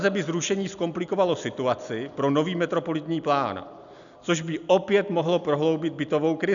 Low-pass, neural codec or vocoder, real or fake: 7.2 kHz; none; real